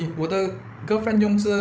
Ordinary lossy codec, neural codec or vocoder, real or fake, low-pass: none; codec, 16 kHz, 16 kbps, FreqCodec, larger model; fake; none